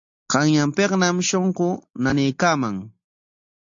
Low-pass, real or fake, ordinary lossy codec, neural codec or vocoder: 7.2 kHz; real; AAC, 64 kbps; none